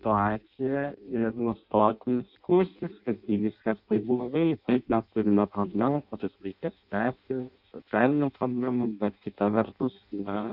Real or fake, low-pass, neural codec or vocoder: fake; 5.4 kHz; codec, 16 kHz in and 24 kHz out, 0.6 kbps, FireRedTTS-2 codec